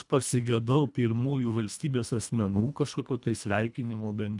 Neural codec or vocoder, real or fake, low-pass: codec, 24 kHz, 1.5 kbps, HILCodec; fake; 10.8 kHz